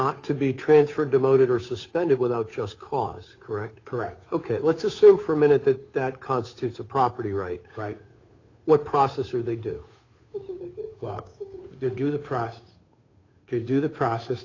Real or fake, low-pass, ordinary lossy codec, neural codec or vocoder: fake; 7.2 kHz; AAC, 32 kbps; codec, 16 kHz, 8 kbps, FunCodec, trained on Chinese and English, 25 frames a second